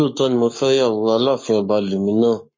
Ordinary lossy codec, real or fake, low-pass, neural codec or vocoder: MP3, 32 kbps; fake; 7.2 kHz; codec, 44.1 kHz, 7.8 kbps, Pupu-Codec